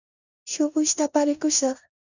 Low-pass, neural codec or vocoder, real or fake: 7.2 kHz; codec, 16 kHz in and 24 kHz out, 0.9 kbps, LongCat-Audio-Codec, four codebook decoder; fake